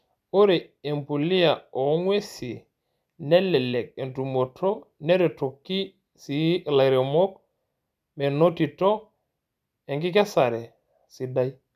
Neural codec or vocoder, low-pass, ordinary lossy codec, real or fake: none; 14.4 kHz; none; real